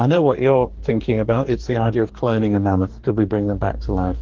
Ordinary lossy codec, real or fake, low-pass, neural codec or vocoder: Opus, 16 kbps; fake; 7.2 kHz; codec, 44.1 kHz, 2.6 kbps, DAC